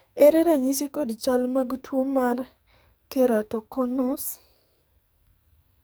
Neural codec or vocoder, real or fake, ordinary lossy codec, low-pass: codec, 44.1 kHz, 2.6 kbps, SNAC; fake; none; none